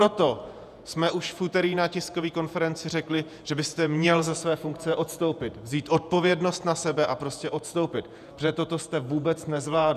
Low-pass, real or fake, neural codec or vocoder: 14.4 kHz; fake; vocoder, 48 kHz, 128 mel bands, Vocos